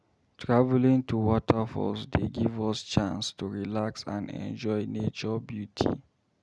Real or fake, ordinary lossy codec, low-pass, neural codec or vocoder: real; none; none; none